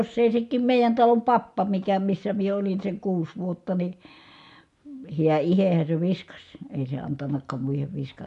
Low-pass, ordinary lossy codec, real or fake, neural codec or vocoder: 10.8 kHz; MP3, 64 kbps; real; none